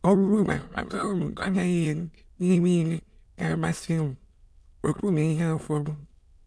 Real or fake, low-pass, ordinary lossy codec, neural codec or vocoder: fake; none; none; autoencoder, 22.05 kHz, a latent of 192 numbers a frame, VITS, trained on many speakers